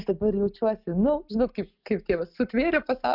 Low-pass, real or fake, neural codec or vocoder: 5.4 kHz; real; none